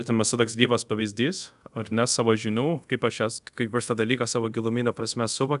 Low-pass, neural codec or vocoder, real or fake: 10.8 kHz; codec, 24 kHz, 0.5 kbps, DualCodec; fake